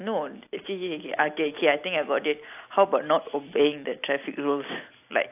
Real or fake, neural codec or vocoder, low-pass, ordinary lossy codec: real; none; 3.6 kHz; AAC, 32 kbps